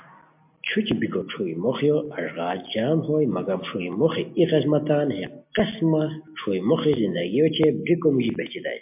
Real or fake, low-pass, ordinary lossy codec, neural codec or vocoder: real; 3.6 kHz; MP3, 32 kbps; none